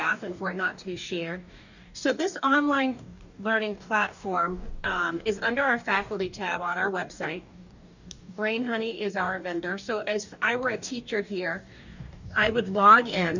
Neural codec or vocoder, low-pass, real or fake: codec, 44.1 kHz, 2.6 kbps, DAC; 7.2 kHz; fake